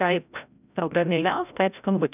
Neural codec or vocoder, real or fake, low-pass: codec, 16 kHz, 0.5 kbps, FreqCodec, larger model; fake; 3.6 kHz